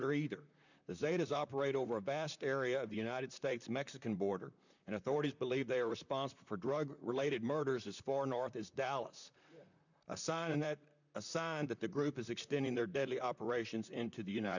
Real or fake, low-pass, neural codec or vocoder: fake; 7.2 kHz; vocoder, 44.1 kHz, 128 mel bands, Pupu-Vocoder